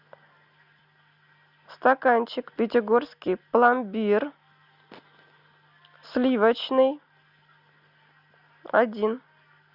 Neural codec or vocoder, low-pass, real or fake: none; 5.4 kHz; real